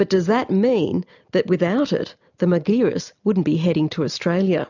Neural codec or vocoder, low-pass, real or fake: none; 7.2 kHz; real